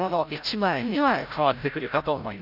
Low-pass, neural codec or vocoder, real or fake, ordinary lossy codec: 5.4 kHz; codec, 16 kHz, 0.5 kbps, FreqCodec, larger model; fake; none